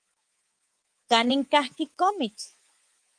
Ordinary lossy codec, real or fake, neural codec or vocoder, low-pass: Opus, 24 kbps; fake; codec, 24 kHz, 3.1 kbps, DualCodec; 9.9 kHz